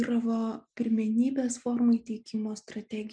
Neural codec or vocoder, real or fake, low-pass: none; real; 9.9 kHz